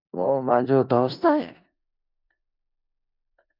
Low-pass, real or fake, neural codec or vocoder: 5.4 kHz; fake; codec, 16 kHz in and 24 kHz out, 0.4 kbps, LongCat-Audio-Codec, four codebook decoder